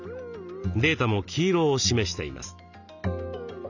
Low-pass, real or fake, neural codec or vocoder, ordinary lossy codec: 7.2 kHz; real; none; none